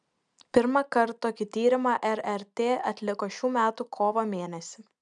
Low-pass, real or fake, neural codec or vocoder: 9.9 kHz; real; none